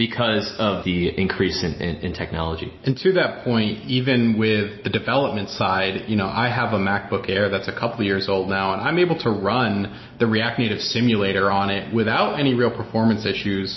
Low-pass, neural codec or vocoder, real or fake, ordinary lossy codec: 7.2 kHz; none; real; MP3, 24 kbps